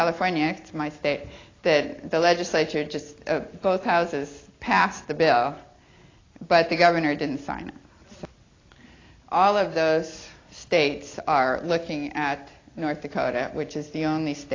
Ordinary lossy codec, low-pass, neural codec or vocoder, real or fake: AAC, 32 kbps; 7.2 kHz; none; real